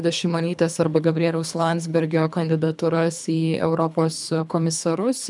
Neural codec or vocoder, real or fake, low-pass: codec, 24 kHz, 3 kbps, HILCodec; fake; 10.8 kHz